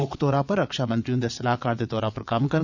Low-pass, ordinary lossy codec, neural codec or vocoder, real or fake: 7.2 kHz; none; vocoder, 22.05 kHz, 80 mel bands, WaveNeXt; fake